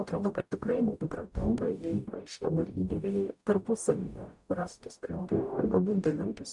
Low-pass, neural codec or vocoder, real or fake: 10.8 kHz; codec, 44.1 kHz, 0.9 kbps, DAC; fake